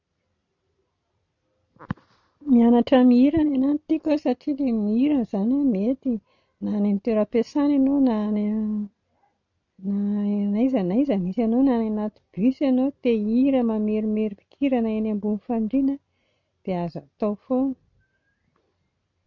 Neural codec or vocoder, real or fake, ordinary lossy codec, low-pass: none; real; none; 7.2 kHz